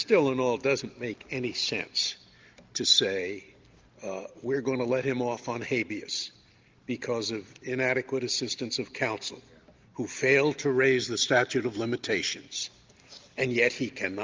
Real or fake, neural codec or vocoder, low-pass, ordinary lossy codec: real; none; 7.2 kHz; Opus, 32 kbps